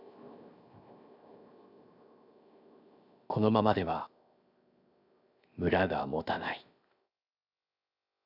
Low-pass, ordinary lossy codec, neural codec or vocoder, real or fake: 5.4 kHz; none; codec, 16 kHz, 0.7 kbps, FocalCodec; fake